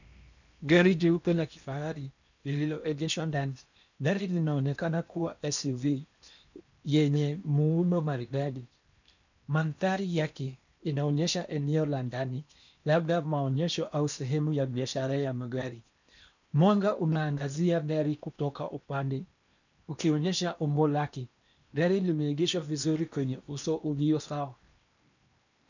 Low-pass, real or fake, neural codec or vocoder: 7.2 kHz; fake; codec, 16 kHz in and 24 kHz out, 0.8 kbps, FocalCodec, streaming, 65536 codes